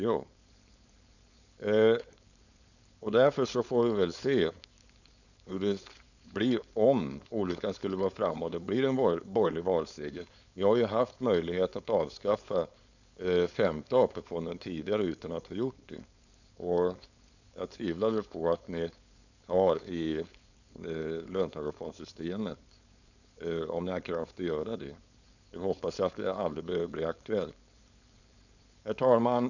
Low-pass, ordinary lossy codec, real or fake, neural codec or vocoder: 7.2 kHz; none; fake; codec, 16 kHz, 4.8 kbps, FACodec